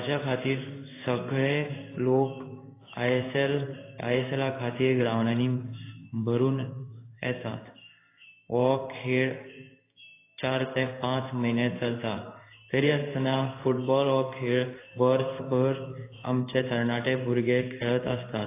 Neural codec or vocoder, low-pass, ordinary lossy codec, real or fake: codec, 16 kHz in and 24 kHz out, 1 kbps, XY-Tokenizer; 3.6 kHz; AAC, 24 kbps; fake